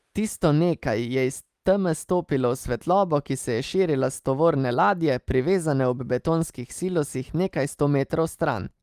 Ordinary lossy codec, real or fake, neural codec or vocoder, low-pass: Opus, 32 kbps; real; none; 14.4 kHz